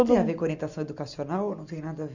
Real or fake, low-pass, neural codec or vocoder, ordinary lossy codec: real; 7.2 kHz; none; none